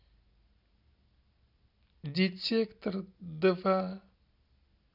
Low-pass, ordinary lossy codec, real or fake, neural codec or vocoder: 5.4 kHz; none; real; none